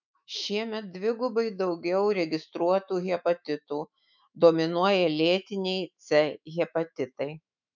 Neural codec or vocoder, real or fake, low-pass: autoencoder, 48 kHz, 128 numbers a frame, DAC-VAE, trained on Japanese speech; fake; 7.2 kHz